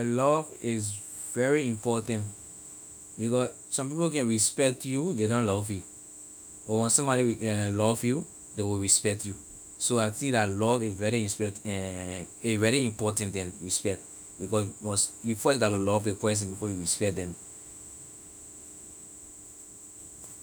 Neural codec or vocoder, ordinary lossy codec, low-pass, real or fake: autoencoder, 48 kHz, 32 numbers a frame, DAC-VAE, trained on Japanese speech; none; none; fake